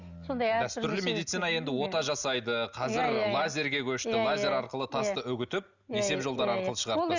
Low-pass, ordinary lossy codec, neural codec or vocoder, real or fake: 7.2 kHz; Opus, 64 kbps; none; real